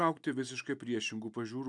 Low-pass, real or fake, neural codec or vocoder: 10.8 kHz; real; none